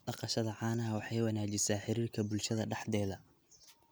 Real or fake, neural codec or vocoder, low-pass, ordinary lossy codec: real; none; none; none